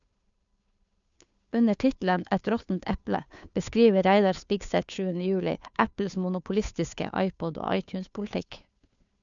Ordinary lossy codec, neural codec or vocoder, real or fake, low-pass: MP3, 96 kbps; codec, 16 kHz, 2 kbps, FunCodec, trained on Chinese and English, 25 frames a second; fake; 7.2 kHz